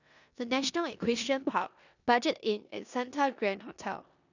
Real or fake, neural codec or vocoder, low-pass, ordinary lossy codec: fake; codec, 16 kHz in and 24 kHz out, 0.9 kbps, LongCat-Audio-Codec, four codebook decoder; 7.2 kHz; none